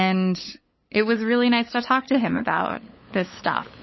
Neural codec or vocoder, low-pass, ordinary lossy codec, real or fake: codec, 16 kHz, 8 kbps, FunCodec, trained on LibriTTS, 25 frames a second; 7.2 kHz; MP3, 24 kbps; fake